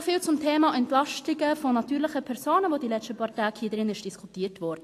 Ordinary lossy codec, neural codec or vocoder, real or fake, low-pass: AAC, 64 kbps; none; real; 14.4 kHz